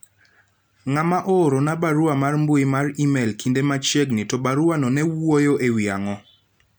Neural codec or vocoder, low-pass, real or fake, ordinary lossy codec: none; none; real; none